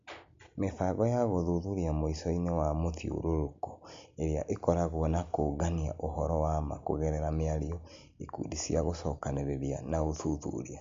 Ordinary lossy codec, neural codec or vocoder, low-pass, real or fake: MP3, 48 kbps; none; 7.2 kHz; real